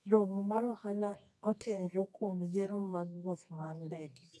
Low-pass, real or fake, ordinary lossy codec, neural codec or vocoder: none; fake; none; codec, 24 kHz, 0.9 kbps, WavTokenizer, medium music audio release